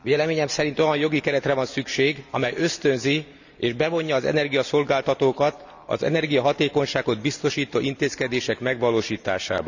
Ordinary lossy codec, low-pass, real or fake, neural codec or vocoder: none; 7.2 kHz; real; none